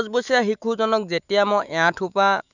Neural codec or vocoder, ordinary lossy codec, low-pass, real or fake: autoencoder, 48 kHz, 128 numbers a frame, DAC-VAE, trained on Japanese speech; none; 7.2 kHz; fake